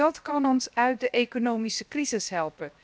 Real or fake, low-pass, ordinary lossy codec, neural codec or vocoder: fake; none; none; codec, 16 kHz, 0.7 kbps, FocalCodec